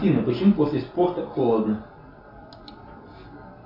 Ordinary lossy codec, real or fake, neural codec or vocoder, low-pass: MP3, 48 kbps; real; none; 5.4 kHz